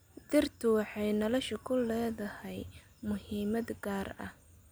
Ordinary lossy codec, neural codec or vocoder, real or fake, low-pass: none; none; real; none